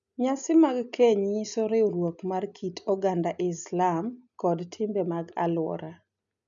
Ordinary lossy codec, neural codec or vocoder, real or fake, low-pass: none; none; real; 7.2 kHz